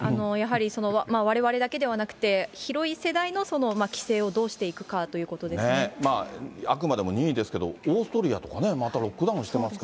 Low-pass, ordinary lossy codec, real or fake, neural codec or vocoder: none; none; real; none